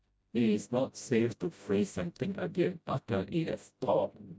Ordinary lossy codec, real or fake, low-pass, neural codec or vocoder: none; fake; none; codec, 16 kHz, 0.5 kbps, FreqCodec, smaller model